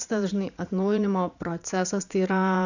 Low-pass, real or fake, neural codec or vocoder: 7.2 kHz; fake; vocoder, 44.1 kHz, 80 mel bands, Vocos